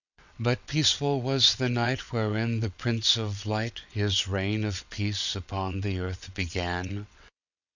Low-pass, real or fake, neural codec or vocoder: 7.2 kHz; fake; vocoder, 22.05 kHz, 80 mel bands, WaveNeXt